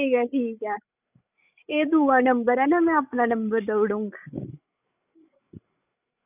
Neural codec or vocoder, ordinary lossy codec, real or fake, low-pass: codec, 16 kHz, 16 kbps, FreqCodec, larger model; none; fake; 3.6 kHz